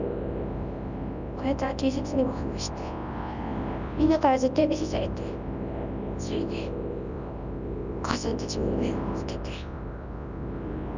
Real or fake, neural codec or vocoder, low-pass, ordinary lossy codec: fake; codec, 24 kHz, 0.9 kbps, WavTokenizer, large speech release; 7.2 kHz; none